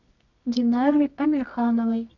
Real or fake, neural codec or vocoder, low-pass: fake; codec, 24 kHz, 0.9 kbps, WavTokenizer, medium music audio release; 7.2 kHz